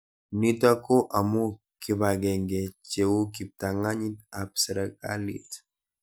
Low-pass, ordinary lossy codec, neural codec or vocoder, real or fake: none; none; none; real